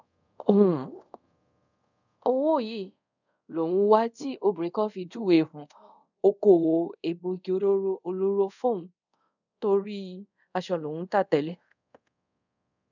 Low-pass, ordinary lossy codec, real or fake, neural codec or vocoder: 7.2 kHz; none; fake; codec, 24 kHz, 0.5 kbps, DualCodec